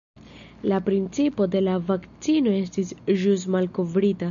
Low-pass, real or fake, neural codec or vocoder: 7.2 kHz; real; none